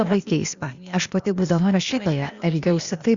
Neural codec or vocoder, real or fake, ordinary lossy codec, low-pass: codec, 16 kHz, 0.8 kbps, ZipCodec; fake; Opus, 64 kbps; 7.2 kHz